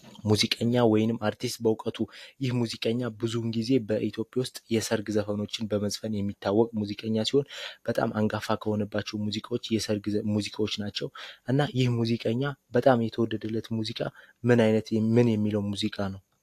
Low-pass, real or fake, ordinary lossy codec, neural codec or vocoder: 14.4 kHz; real; AAC, 64 kbps; none